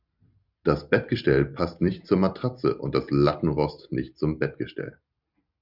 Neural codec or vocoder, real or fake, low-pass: none; real; 5.4 kHz